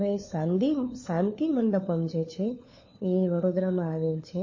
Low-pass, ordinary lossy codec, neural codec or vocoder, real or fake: 7.2 kHz; MP3, 32 kbps; codec, 16 kHz, 4 kbps, FunCodec, trained on LibriTTS, 50 frames a second; fake